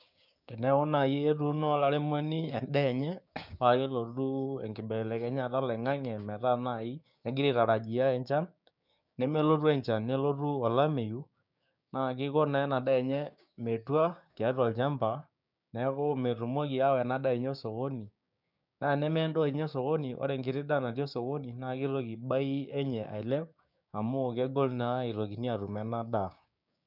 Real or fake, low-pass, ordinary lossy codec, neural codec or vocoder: fake; 5.4 kHz; none; codec, 16 kHz, 6 kbps, DAC